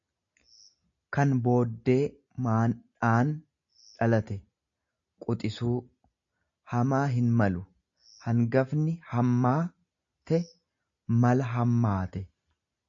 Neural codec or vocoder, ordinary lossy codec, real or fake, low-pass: none; AAC, 64 kbps; real; 7.2 kHz